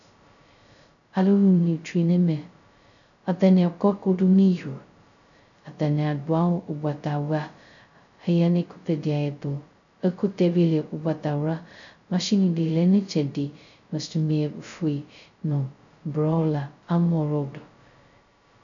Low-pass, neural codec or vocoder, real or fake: 7.2 kHz; codec, 16 kHz, 0.2 kbps, FocalCodec; fake